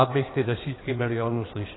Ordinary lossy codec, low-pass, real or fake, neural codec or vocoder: AAC, 16 kbps; 7.2 kHz; fake; codec, 16 kHz in and 24 kHz out, 2.2 kbps, FireRedTTS-2 codec